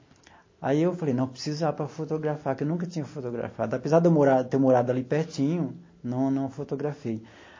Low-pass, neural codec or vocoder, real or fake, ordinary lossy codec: 7.2 kHz; none; real; MP3, 32 kbps